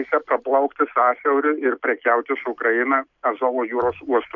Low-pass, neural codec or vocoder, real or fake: 7.2 kHz; none; real